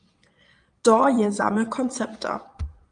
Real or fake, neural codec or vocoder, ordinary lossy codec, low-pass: fake; vocoder, 22.05 kHz, 80 mel bands, Vocos; Opus, 32 kbps; 9.9 kHz